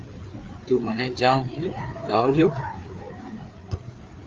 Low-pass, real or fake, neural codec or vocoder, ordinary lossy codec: 7.2 kHz; fake; codec, 16 kHz, 4 kbps, FreqCodec, larger model; Opus, 32 kbps